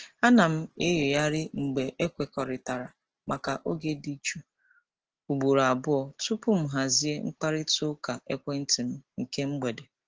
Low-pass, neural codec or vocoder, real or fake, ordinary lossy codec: 7.2 kHz; none; real; Opus, 16 kbps